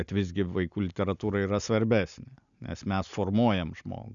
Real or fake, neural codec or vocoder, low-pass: real; none; 7.2 kHz